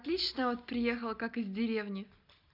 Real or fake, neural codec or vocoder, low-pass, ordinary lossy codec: real; none; 5.4 kHz; none